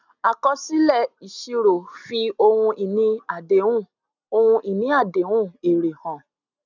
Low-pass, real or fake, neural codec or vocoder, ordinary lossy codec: 7.2 kHz; real; none; none